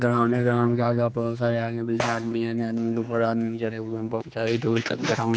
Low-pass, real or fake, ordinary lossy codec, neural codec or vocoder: none; fake; none; codec, 16 kHz, 2 kbps, X-Codec, HuBERT features, trained on general audio